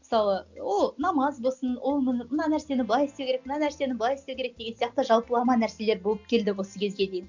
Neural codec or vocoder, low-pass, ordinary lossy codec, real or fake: none; 7.2 kHz; none; real